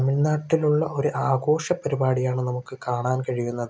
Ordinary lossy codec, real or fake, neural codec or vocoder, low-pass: none; real; none; none